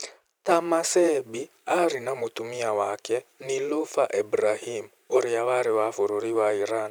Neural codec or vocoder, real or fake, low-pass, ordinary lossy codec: vocoder, 44.1 kHz, 128 mel bands every 512 samples, BigVGAN v2; fake; none; none